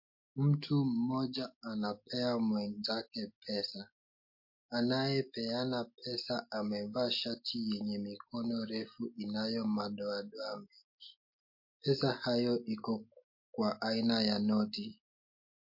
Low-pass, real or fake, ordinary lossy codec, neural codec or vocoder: 5.4 kHz; real; MP3, 32 kbps; none